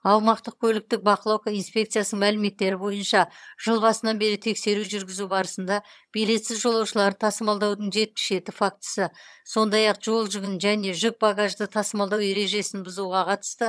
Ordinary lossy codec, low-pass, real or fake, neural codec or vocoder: none; none; fake; vocoder, 22.05 kHz, 80 mel bands, HiFi-GAN